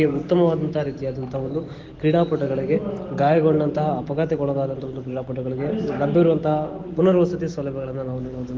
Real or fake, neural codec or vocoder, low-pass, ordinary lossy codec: real; none; 7.2 kHz; Opus, 16 kbps